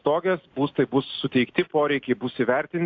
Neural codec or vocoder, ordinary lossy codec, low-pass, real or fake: none; AAC, 48 kbps; 7.2 kHz; real